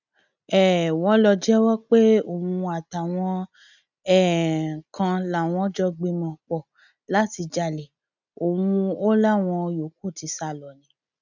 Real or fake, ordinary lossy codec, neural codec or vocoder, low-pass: real; none; none; 7.2 kHz